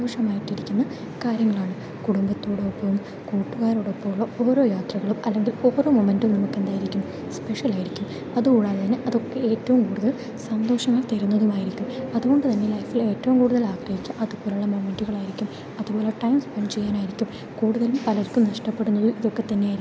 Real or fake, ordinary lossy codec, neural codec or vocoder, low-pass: real; none; none; none